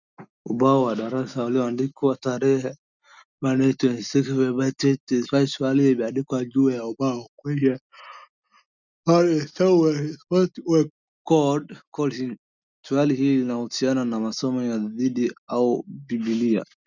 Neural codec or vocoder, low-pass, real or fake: none; 7.2 kHz; real